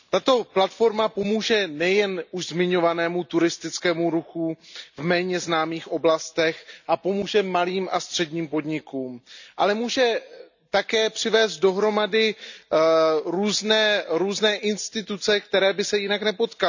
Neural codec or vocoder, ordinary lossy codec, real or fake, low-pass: none; none; real; 7.2 kHz